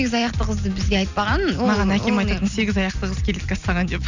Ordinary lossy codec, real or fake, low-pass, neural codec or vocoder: none; real; 7.2 kHz; none